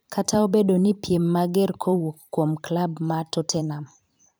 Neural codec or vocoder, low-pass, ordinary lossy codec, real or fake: vocoder, 44.1 kHz, 128 mel bands every 512 samples, BigVGAN v2; none; none; fake